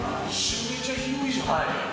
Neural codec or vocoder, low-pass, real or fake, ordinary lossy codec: none; none; real; none